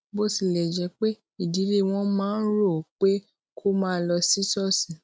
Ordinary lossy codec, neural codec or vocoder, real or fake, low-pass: none; none; real; none